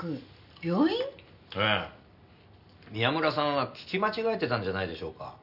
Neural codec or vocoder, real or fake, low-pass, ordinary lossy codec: none; real; 5.4 kHz; none